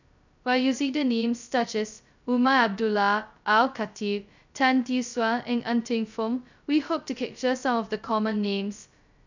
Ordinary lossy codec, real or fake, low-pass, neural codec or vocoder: none; fake; 7.2 kHz; codec, 16 kHz, 0.2 kbps, FocalCodec